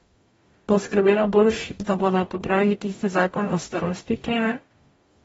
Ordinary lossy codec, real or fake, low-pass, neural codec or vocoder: AAC, 24 kbps; fake; 19.8 kHz; codec, 44.1 kHz, 0.9 kbps, DAC